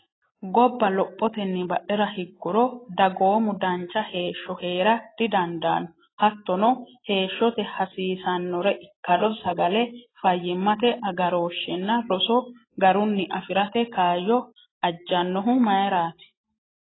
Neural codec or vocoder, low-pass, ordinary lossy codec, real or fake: none; 7.2 kHz; AAC, 16 kbps; real